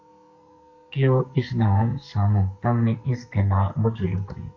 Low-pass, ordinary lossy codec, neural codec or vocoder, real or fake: 7.2 kHz; AAC, 48 kbps; codec, 44.1 kHz, 2.6 kbps, SNAC; fake